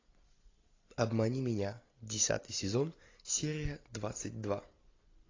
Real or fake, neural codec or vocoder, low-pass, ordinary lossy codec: real; none; 7.2 kHz; AAC, 32 kbps